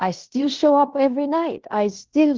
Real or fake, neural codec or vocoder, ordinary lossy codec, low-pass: fake; codec, 16 kHz in and 24 kHz out, 0.9 kbps, LongCat-Audio-Codec, fine tuned four codebook decoder; Opus, 16 kbps; 7.2 kHz